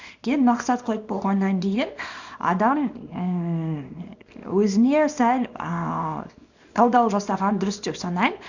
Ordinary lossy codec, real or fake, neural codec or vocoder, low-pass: none; fake; codec, 24 kHz, 0.9 kbps, WavTokenizer, small release; 7.2 kHz